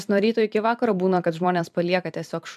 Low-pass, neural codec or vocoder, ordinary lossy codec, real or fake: 14.4 kHz; vocoder, 44.1 kHz, 128 mel bands every 256 samples, BigVGAN v2; MP3, 96 kbps; fake